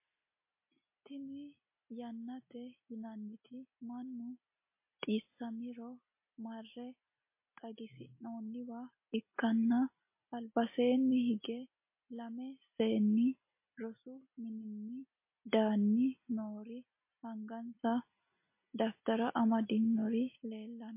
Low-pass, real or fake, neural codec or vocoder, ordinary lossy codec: 3.6 kHz; real; none; MP3, 24 kbps